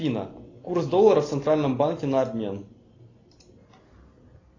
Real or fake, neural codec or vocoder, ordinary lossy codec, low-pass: real; none; AAC, 32 kbps; 7.2 kHz